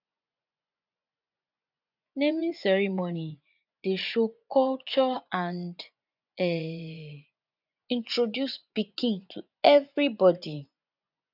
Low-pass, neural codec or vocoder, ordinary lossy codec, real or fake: 5.4 kHz; vocoder, 24 kHz, 100 mel bands, Vocos; none; fake